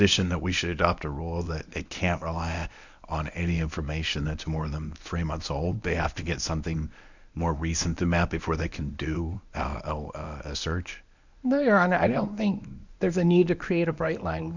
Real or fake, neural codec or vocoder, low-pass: fake; codec, 24 kHz, 0.9 kbps, WavTokenizer, medium speech release version 1; 7.2 kHz